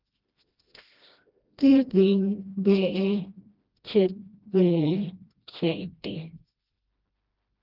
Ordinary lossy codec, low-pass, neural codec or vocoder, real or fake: Opus, 24 kbps; 5.4 kHz; codec, 16 kHz, 1 kbps, FreqCodec, smaller model; fake